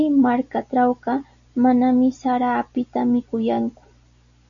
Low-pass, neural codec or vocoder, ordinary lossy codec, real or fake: 7.2 kHz; none; MP3, 96 kbps; real